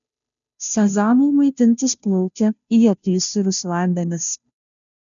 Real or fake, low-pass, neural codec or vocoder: fake; 7.2 kHz; codec, 16 kHz, 0.5 kbps, FunCodec, trained on Chinese and English, 25 frames a second